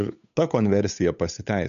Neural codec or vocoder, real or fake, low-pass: codec, 16 kHz, 8 kbps, FunCodec, trained on Chinese and English, 25 frames a second; fake; 7.2 kHz